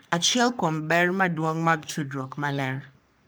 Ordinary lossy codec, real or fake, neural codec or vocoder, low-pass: none; fake; codec, 44.1 kHz, 3.4 kbps, Pupu-Codec; none